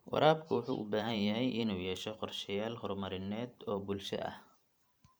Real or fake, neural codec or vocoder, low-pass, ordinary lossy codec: fake; vocoder, 44.1 kHz, 128 mel bands every 512 samples, BigVGAN v2; none; none